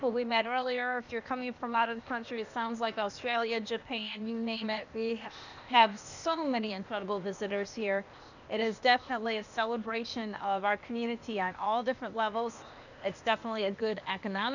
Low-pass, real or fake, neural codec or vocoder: 7.2 kHz; fake; codec, 16 kHz, 0.8 kbps, ZipCodec